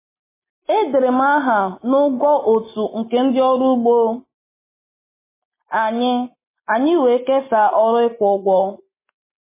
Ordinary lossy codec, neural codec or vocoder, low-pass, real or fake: MP3, 16 kbps; none; 3.6 kHz; real